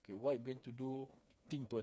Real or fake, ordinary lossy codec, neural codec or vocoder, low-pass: fake; none; codec, 16 kHz, 4 kbps, FreqCodec, smaller model; none